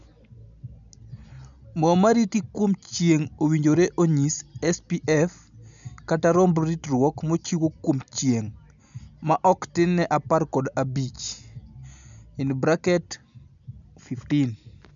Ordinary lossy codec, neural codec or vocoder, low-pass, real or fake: none; none; 7.2 kHz; real